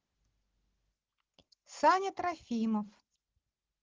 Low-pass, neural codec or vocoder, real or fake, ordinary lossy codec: 7.2 kHz; none; real; Opus, 16 kbps